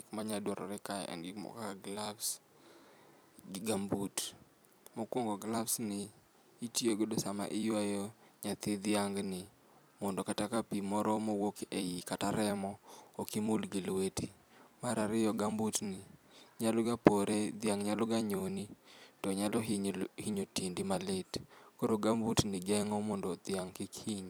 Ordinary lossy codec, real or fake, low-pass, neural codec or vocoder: none; fake; none; vocoder, 44.1 kHz, 128 mel bands every 256 samples, BigVGAN v2